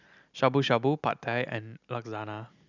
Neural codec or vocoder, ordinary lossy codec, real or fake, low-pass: none; none; real; 7.2 kHz